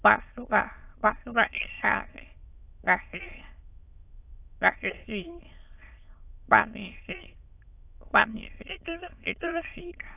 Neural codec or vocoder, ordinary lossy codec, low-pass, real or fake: autoencoder, 22.05 kHz, a latent of 192 numbers a frame, VITS, trained on many speakers; AAC, 24 kbps; 3.6 kHz; fake